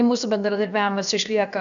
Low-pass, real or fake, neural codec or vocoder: 7.2 kHz; fake; codec, 16 kHz, about 1 kbps, DyCAST, with the encoder's durations